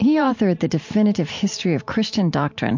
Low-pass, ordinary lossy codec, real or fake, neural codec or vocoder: 7.2 kHz; MP3, 48 kbps; fake; vocoder, 44.1 kHz, 128 mel bands every 512 samples, BigVGAN v2